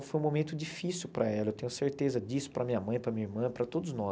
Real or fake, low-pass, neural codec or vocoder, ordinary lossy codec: real; none; none; none